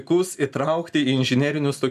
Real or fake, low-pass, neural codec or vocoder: real; 14.4 kHz; none